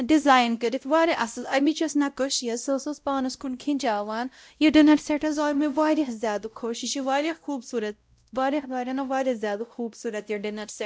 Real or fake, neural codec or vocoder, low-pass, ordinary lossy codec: fake; codec, 16 kHz, 0.5 kbps, X-Codec, WavLM features, trained on Multilingual LibriSpeech; none; none